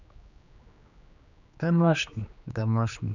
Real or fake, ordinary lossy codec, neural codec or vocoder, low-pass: fake; none; codec, 16 kHz, 2 kbps, X-Codec, HuBERT features, trained on general audio; 7.2 kHz